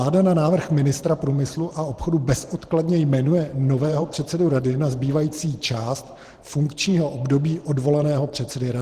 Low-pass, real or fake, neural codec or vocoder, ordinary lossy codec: 14.4 kHz; fake; vocoder, 44.1 kHz, 128 mel bands every 512 samples, BigVGAN v2; Opus, 16 kbps